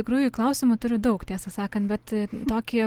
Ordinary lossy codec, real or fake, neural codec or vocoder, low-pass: Opus, 16 kbps; real; none; 19.8 kHz